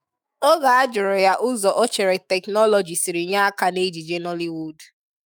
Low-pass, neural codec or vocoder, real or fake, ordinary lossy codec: none; autoencoder, 48 kHz, 128 numbers a frame, DAC-VAE, trained on Japanese speech; fake; none